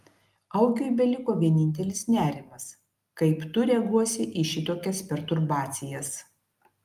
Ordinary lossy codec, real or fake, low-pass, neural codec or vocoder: Opus, 32 kbps; real; 14.4 kHz; none